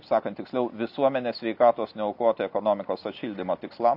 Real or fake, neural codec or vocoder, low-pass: real; none; 5.4 kHz